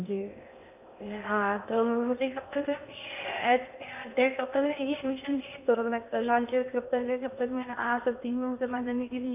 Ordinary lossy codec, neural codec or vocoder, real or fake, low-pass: AAC, 32 kbps; codec, 16 kHz in and 24 kHz out, 0.6 kbps, FocalCodec, streaming, 2048 codes; fake; 3.6 kHz